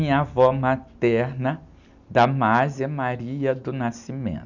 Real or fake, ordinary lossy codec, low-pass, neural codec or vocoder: real; none; 7.2 kHz; none